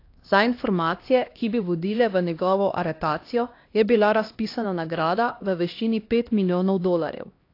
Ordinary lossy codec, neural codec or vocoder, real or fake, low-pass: AAC, 32 kbps; codec, 16 kHz, 1 kbps, X-Codec, HuBERT features, trained on LibriSpeech; fake; 5.4 kHz